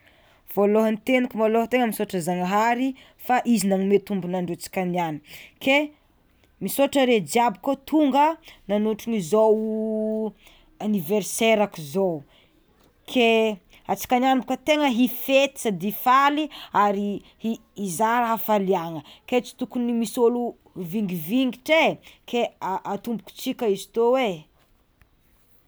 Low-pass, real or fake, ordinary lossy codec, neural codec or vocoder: none; real; none; none